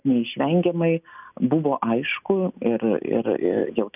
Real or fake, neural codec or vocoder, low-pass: real; none; 3.6 kHz